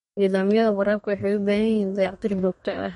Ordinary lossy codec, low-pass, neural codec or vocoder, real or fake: MP3, 48 kbps; 14.4 kHz; codec, 32 kHz, 1.9 kbps, SNAC; fake